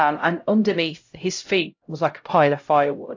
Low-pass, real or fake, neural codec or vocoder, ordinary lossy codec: 7.2 kHz; fake; codec, 16 kHz, 0.5 kbps, X-Codec, HuBERT features, trained on LibriSpeech; AAC, 48 kbps